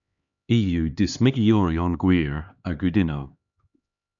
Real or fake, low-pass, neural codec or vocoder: fake; 7.2 kHz; codec, 16 kHz, 2 kbps, X-Codec, HuBERT features, trained on LibriSpeech